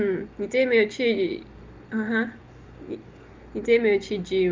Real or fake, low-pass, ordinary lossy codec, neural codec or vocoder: real; 7.2 kHz; Opus, 32 kbps; none